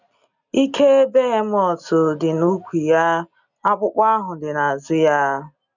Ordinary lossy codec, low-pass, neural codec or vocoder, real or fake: none; 7.2 kHz; none; real